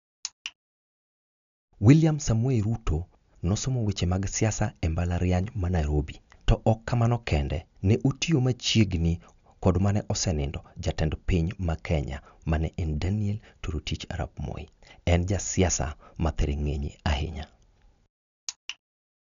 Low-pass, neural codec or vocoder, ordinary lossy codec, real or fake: 7.2 kHz; none; none; real